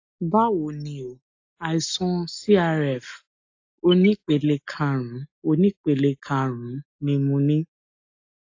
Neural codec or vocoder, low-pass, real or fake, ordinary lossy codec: none; 7.2 kHz; real; AAC, 48 kbps